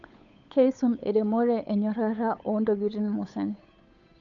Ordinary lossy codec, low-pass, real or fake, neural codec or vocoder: none; 7.2 kHz; fake; codec, 16 kHz, 8 kbps, FunCodec, trained on Chinese and English, 25 frames a second